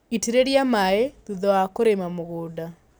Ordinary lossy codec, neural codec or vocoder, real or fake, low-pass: none; none; real; none